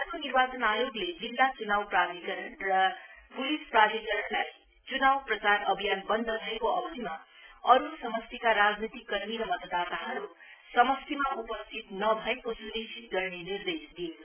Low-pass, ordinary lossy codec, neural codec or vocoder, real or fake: 3.6 kHz; none; none; real